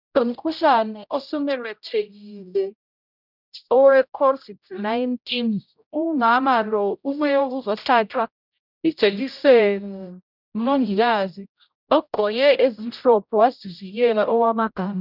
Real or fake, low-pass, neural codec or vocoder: fake; 5.4 kHz; codec, 16 kHz, 0.5 kbps, X-Codec, HuBERT features, trained on general audio